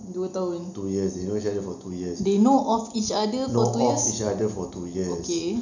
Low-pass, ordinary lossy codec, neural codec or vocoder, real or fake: 7.2 kHz; none; none; real